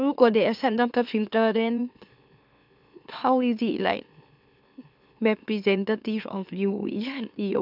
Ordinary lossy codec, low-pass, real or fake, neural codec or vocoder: none; 5.4 kHz; fake; autoencoder, 44.1 kHz, a latent of 192 numbers a frame, MeloTTS